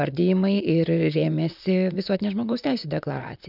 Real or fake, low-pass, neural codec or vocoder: fake; 5.4 kHz; vocoder, 44.1 kHz, 128 mel bands, Pupu-Vocoder